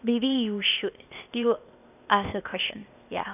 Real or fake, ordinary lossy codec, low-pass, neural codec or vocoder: fake; none; 3.6 kHz; codec, 16 kHz, 0.8 kbps, ZipCodec